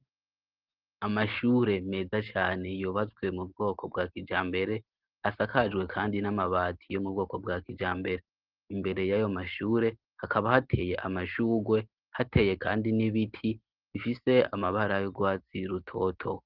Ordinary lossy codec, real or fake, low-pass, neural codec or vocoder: Opus, 16 kbps; real; 5.4 kHz; none